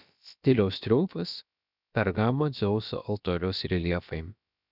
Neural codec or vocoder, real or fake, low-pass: codec, 16 kHz, about 1 kbps, DyCAST, with the encoder's durations; fake; 5.4 kHz